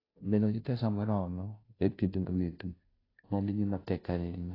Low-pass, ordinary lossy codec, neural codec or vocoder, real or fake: 5.4 kHz; AAC, 24 kbps; codec, 16 kHz, 0.5 kbps, FunCodec, trained on Chinese and English, 25 frames a second; fake